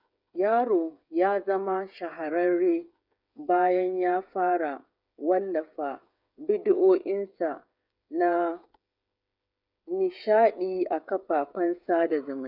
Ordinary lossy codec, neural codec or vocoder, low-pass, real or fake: none; codec, 16 kHz, 8 kbps, FreqCodec, smaller model; 5.4 kHz; fake